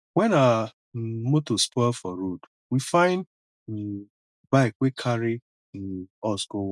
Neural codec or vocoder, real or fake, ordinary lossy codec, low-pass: none; real; none; none